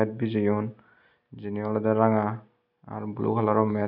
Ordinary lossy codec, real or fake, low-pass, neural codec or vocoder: none; real; 5.4 kHz; none